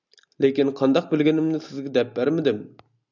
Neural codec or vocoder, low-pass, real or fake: none; 7.2 kHz; real